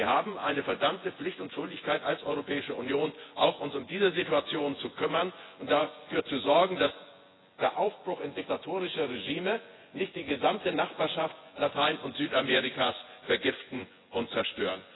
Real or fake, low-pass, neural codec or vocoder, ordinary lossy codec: fake; 7.2 kHz; vocoder, 24 kHz, 100 mel bands, Vocos; AAC, 16 kbps